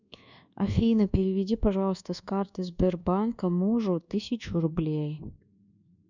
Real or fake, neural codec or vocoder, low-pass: fake; codec, 24 kHz, 1.2 kbps, DualCodec; 7.2 kHz